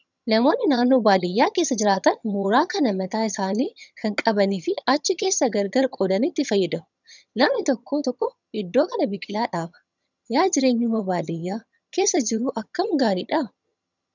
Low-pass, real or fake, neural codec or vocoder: 7.2 kHz; fake; vocoder, 22.05 kHz, 80 mel bands, HiFi-GAN